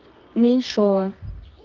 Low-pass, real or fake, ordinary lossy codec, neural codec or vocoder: 7.2 kHz; fake; Opus, 24 kbps; codec, 24 kHz, 0.9 kbps, WavTokenizer, medium music audio release